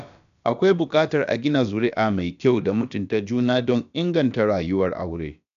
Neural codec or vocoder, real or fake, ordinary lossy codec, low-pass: codec, 16 kHz, about 1 kbps, DyCAST, with the encoder's durations; fake; none; 7.2 kHz